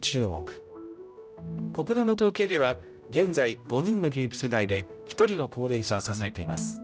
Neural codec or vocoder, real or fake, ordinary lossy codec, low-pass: codec, 16 kHz, 0.5 kbps, X-Codec, HuBERT features, trained on general audio; fake; none; none